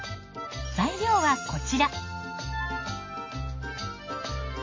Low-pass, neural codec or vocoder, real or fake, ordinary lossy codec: 7.2 kHz; none; real; MP3, 32 kbps